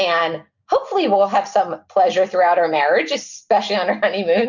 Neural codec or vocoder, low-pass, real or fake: none; 7.2 kHz; real